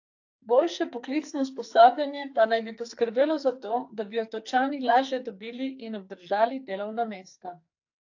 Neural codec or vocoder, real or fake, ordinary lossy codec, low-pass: codec, 44.1 kHz, 2.6 kbps, SNAC; fake; AAC, 48 kbps; 7.2 kHz